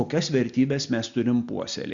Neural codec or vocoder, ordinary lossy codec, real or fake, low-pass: none; Opus, 64 kbps; real; 7.2 kHz